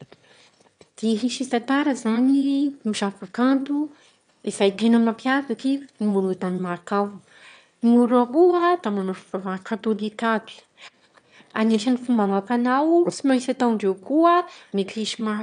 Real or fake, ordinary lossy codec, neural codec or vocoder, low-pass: fake; none; autoencoder, 22.05 kHz, a latent of 192 numbers a frame, VITS, trained on one speaker; 9.9 kHz